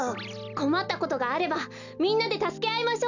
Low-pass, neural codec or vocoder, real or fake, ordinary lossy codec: 7.2 kHz; none; real; none